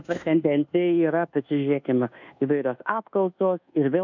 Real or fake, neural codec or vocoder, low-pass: fake; codec, 24 kHz, 1.2 kbps, DualCodec; 7.2 kHz